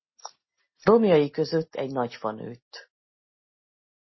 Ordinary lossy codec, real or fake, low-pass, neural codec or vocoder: MP3, 24 kbps; real; 7.2 kHz; none